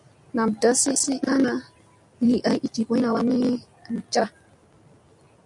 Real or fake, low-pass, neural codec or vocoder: real; 10.8 kHz; none